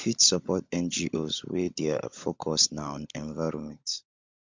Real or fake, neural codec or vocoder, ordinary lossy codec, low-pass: fake; codec, 16 kHz, 16 kbps, FunCodec, trained on LibriTTS, 50 frames a second; MP3, 64 kbps; 7.2 kHz